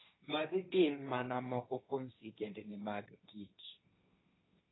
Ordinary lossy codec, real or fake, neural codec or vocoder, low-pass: AAC, 16 kbps; fake; codec, 16 kHz, 1.1 kbps, Voila-Tokenizer; 7.2 kHz